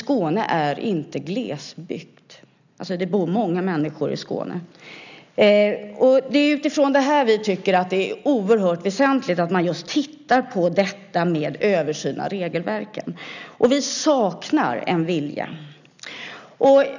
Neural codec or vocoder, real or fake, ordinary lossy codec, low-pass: none; real; none; 7.2 kHz